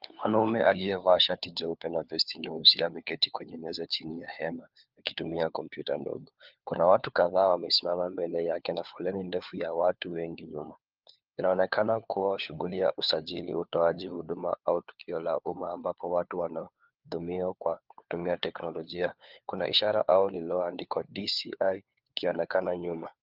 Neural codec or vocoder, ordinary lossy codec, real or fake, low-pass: codec, 16 kHz, 4 kbps, FunCodec, trained on LibriTTS, 50 frames a second; Opus, 24 kbps; fake; 5.4 kHz